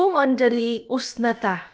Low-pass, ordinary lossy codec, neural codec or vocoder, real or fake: none; none; codec, 16 kHz, about 1 kbps, DyCAST, with the encoder's durations; fake